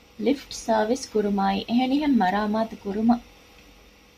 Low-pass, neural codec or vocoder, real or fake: 14.4 kHz; none; real